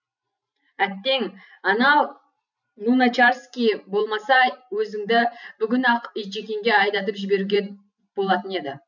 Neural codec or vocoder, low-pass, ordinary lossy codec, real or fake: vocoder, 44.1 kHz, 128 mel bands every 512 samples, BigVGAN v2; 7.2 kHz; none; fake